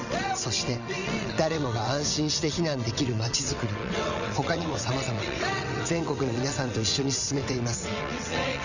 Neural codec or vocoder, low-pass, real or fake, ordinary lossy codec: vocoder, 22.05 kHz, 80 mel bands, WaveNeXt; 7.2 kHz; fake; none